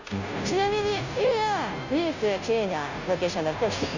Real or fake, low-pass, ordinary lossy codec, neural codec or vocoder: fake; 7.2 kHz; none; codec, 16 kHz, 0.5 kbps, FunCodec, trained on Chinese and English, 25 frames a second